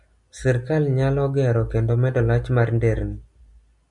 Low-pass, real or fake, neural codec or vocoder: 10.8 kHz; real; none